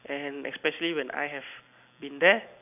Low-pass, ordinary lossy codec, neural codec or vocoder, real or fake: 3.6 kHz; none; none; real